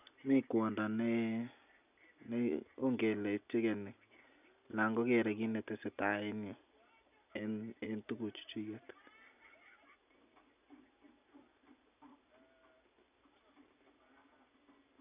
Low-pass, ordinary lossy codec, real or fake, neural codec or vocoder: 3.6 kHz; none; real; none